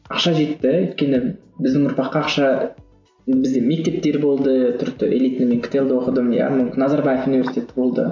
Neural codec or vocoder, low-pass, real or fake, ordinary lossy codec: none; 7.2 kHz; real; MP3, 64 kbps